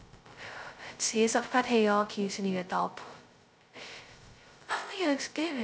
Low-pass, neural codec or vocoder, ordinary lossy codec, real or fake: none; codec, 16 kHz, 0.2 kbps, FocalCodec; none; fake